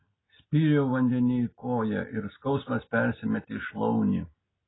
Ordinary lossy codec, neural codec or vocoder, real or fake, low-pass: AAC, 16 kbps; none; real; 7.2 kHz